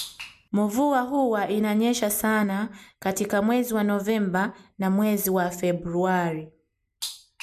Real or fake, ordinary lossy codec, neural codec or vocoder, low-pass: real; MP3, 96 kbps; none; 14.4 kHz